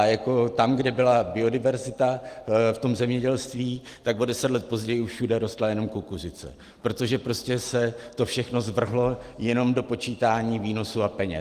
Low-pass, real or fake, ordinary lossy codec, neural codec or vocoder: 14.4 kHz; real; Opus, 24 kbps; none